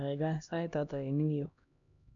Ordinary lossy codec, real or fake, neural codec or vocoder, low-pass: none; fake; codec, 16 kHz, 1 kbps, X-Codec, HuBERT features, trained on LibriSpeech; 7.2 kHz